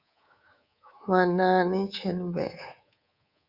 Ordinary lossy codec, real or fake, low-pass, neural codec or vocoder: Opus, 64 kbps; fake; 5.4 kHz; codec, 24 kHz, 3.1 kbps, DualCodec